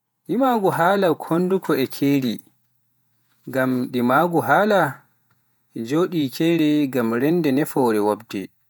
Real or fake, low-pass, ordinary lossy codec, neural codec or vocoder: fake; none; none; vocoder, 48 kHz, 128 mel bands, Vocos